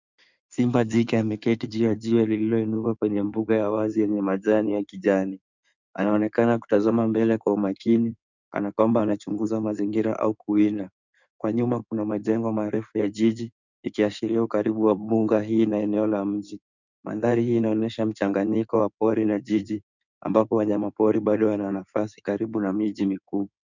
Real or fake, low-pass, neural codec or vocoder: fake; 7.2 kHz; codec, 16 kHz in and 24 kHz out, 2.2 kbps, FireRedTTS-2 codec